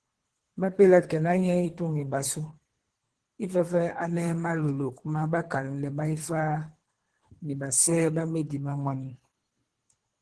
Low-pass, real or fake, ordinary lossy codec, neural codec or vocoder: 10.8 kHz; fake; Opus, 16 kbps; codec, 24 kHz, 3 kbps, HILCodec